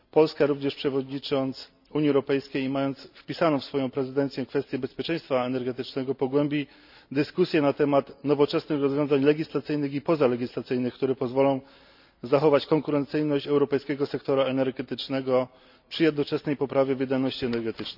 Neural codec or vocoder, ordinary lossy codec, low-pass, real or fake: none; none; 5.4 kHz; real